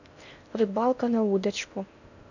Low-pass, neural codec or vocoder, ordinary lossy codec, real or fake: 7.2 kHz; codec, 16 kHz in and 24 kHz out, 0.6 kbps, FocalCodec, streaming, 4096 codes; none; fake